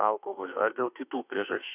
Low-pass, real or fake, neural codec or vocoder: 3.6 kHz; fake; autoencoder, 48 kHz, 32 numbers a frame, DAC-VAE, trained on Japanese speech